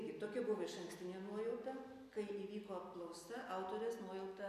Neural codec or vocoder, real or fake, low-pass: none; real; 14.4 kHz